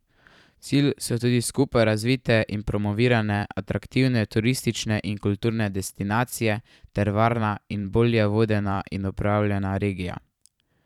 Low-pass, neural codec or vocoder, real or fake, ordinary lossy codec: 19.8 kHz; vocoder, 44.1 kHz, 128 mel bands every 512 samples, BigVGAN v2; fake; none